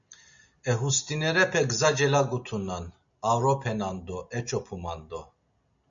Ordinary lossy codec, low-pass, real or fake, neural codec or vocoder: MP3, 64 kbps; 7.2 kHz; real; none